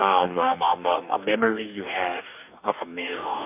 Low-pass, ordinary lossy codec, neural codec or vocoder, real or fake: 3.6 kHz; none; codec, 44.1 kHz, 2.6 kbps, DAC; fake